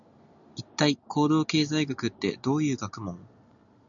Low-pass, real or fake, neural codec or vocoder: 7.2 kHz; real; none